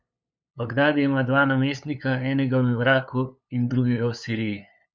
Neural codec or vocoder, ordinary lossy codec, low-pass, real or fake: codec, 16 kHz, 8 kbps, FunCodec, trained on LibriTTS, 25 frames a second; none; none; fake